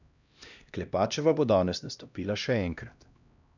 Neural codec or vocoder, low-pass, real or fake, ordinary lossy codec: codec, 16 kHz, 1 kbps, X-Codec, HuBERT features, trained on LibriSpeech; 7.2 kHz; fake; none